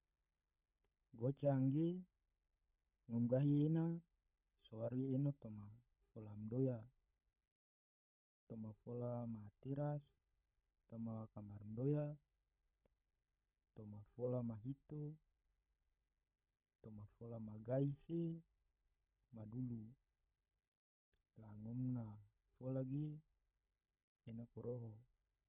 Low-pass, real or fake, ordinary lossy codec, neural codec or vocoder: 3.6 kHz; fake; none; codec, 16 kHz, 16 kbps, FunCodec, trained on LibriTTS, 50 frames a second